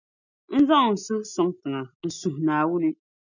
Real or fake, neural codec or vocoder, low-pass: real; none; 7.2 kHz